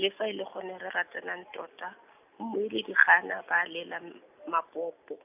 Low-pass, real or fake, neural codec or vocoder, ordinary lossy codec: 3.6 kHz; real; none; AAC, 32 kbps